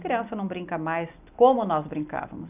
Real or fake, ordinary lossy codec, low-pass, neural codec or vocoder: real; none; 3.6 kHz; none